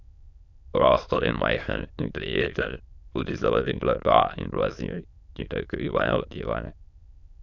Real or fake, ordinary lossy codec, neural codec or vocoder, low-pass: fake; none; autoencoder, 22.05 kHz, a latent of 192 numbers a frame, VITS, trained on many speakers; 7.2 kHz